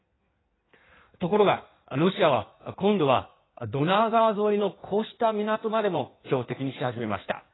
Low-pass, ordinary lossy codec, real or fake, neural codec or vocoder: 7.2 kHz; AAC, 16 kbps; fake; codec, 16 kHz in and 24 kHz out, 1.1 kbps, FireRedTTS-2 codec